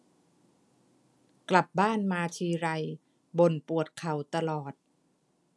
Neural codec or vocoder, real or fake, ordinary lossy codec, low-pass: none; real; none; none